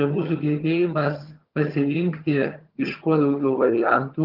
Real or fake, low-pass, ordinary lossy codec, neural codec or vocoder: fake; 5.4 kHz; Opus, 32 kbps; vocoder, 22.05 kHz, 80 mel bands, HiFi-GAN